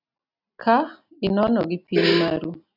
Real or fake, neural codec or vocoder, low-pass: real; none; 5.4 kHz